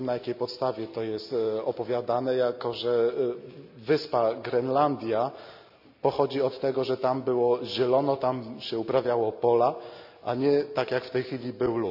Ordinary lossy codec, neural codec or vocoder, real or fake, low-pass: none; none; real; 5.4 kHz